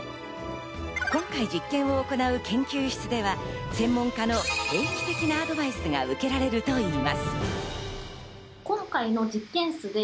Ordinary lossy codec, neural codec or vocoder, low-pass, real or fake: none; none; none; real